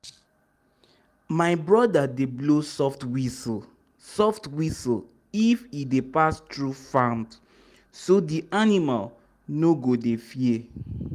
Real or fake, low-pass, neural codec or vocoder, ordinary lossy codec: real; 14.4 kHz; none; Opus, 32 kbps